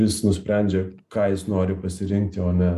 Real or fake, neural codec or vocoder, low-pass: real; none; 14.4 kHz